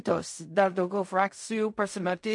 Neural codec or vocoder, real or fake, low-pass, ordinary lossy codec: codec, 16 kHz in and 24 kHz out, 0.4 kbps, LongCat-Audio-Codec, fine tuned four codebook decoder; fake; 10.8 kHz; MP3, 48 kbps